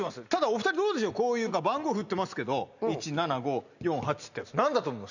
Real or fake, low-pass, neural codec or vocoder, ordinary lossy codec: real; 7.2 kHz; none; none